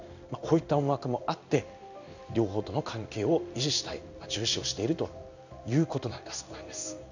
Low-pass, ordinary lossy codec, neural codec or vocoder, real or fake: 7.2 kHz; none; codec, 16 kHz in and 24 kHz out, 1 kbps, XY-Tokenizer; fake